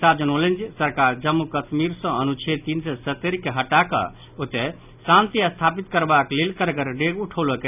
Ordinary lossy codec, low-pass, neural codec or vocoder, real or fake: none; 3.6 kHz; none; real